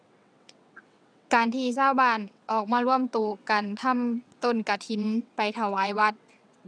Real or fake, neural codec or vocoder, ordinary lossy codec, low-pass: fake; vocoder, 22.05 kHz, 80 mel bands, WaveNeXt; none; 9.9 kHz